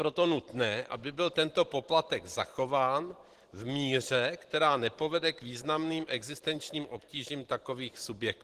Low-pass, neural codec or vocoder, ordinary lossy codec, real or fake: 14.4 kHz; vocoder, 44.1 kHz, 128 mel bands every 512 samples, BigVGAN v2; Opus, 16 kbps; fake